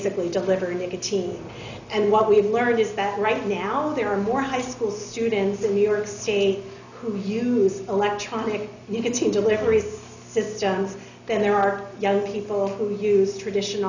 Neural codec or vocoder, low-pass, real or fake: none; 7.2 kHz; real